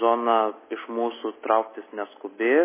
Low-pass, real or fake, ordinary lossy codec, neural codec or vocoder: 3.6 kHz; real; MP3, 16 kbps; none